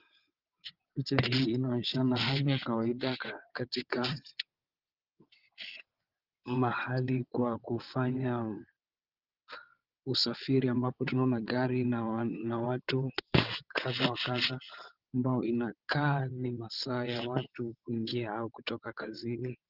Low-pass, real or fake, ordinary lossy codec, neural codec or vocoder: 5.4 kHz; fake; Opus, 32 kbps; vocoder, 22.05 kHz, 80 mel bands, WaveNeXt